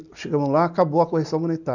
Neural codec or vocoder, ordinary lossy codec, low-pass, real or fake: none; none; 7.2 kHz; real